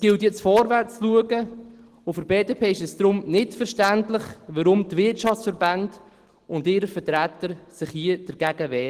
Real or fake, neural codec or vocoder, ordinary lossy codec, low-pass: real; none; Opus, 16 kbps; 14.4 kHz